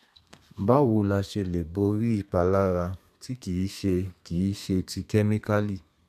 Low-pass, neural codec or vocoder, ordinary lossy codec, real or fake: 14.4 kHz; codec, 32 kHz, 1.9 kbps, SNAC; none; fake